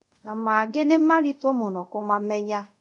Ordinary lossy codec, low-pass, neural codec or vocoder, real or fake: none; 10.8 kHz; codec, 24 kHz, 0.5 kbps, DualCodec; fake